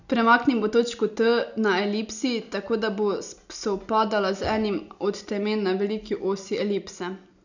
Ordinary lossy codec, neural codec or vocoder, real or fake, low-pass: none; none; real; 7.2 kHz